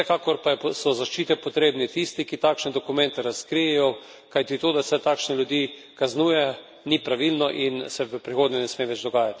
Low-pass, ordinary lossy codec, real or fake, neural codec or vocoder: none; none; real; none